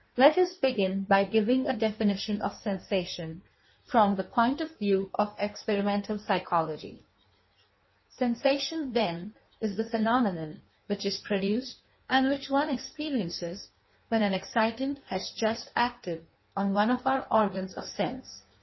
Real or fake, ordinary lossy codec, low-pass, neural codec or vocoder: fake; MP3, 24 kbps; 7.2 kHz; codec, 16 kHz in and 24 kHz out, 1.1 kbps, FireRedTTS-2 codec